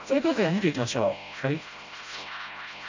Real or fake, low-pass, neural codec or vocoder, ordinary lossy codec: fake; 7.2 kHz; codec, 16 kHz, 0.5 kbps, FreqCodec, smaller model; MP3, 64 kbps